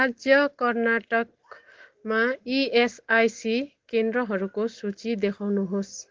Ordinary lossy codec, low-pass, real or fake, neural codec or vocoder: Opus, 16 kbps; 7.2 kHz; real; none